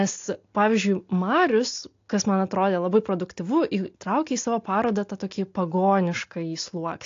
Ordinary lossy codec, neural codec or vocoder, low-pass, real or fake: AAC, 48 kbps; none; 7.2 kHz; real